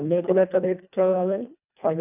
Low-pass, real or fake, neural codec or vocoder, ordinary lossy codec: 3.6 kHz; fake; codec, 24 kHz, 1.5 kbps, HILCodec; none